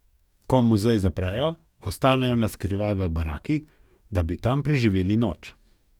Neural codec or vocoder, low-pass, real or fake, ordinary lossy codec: codec, 44.1 kHz, 2.6 kbps, DAC; 19.8 kHz; fake; none